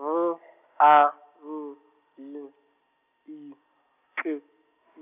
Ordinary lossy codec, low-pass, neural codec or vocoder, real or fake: none; 3.6 kHz; none; real